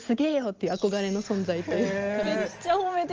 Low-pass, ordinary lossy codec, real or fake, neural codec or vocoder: 7.2 kHz; Opus, 24 kbps; real; none